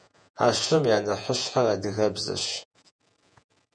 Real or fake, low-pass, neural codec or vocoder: fake; 9.9 kHz; vocoder, 48 kHz, 128 mel bands, Vocos